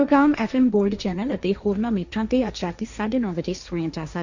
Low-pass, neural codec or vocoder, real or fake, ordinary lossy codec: 7.2 kHz; codec, 16 kHz, 1.1 kbps, Voila-Tokenizer; fake; none